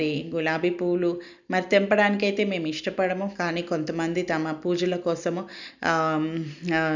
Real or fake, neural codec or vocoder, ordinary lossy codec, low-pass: real; none; none; 7.2 kHz